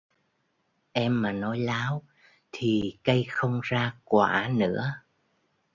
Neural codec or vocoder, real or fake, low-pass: none; real; 7.2 kHz